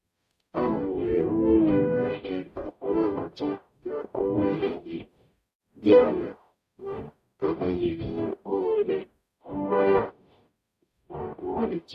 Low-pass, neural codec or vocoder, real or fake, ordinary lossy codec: 14.4 kHz; codec, 44.1 kHz, 0.9 kbps, DAC; fake; none